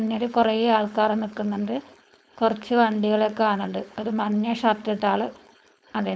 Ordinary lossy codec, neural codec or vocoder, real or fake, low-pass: none; codec, 16 kHz, 4.8 kbps, FACodec; fake; none